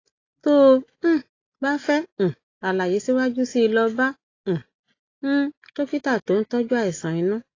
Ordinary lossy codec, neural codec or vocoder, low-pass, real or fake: AAC, 32 kbps; none; 7.2 kHz; real